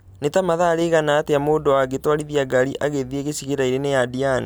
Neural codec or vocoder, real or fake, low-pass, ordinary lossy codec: none; real; none; none